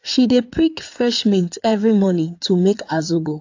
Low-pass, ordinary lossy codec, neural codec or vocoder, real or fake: 7.2 kHz; AAC, 48 kbps; codec, 16 kHz in and 24 kHz out, 2.2 kbps, FireRedTTS-2 codec; fake